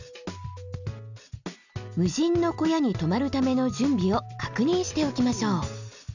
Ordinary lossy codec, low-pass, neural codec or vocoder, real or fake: none; 7.2 kHz; none; real